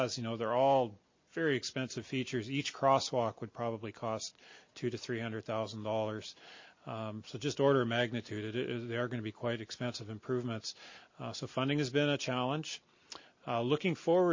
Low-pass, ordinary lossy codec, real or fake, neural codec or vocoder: 7.2 kHz; MP3, 32 kbps; real; none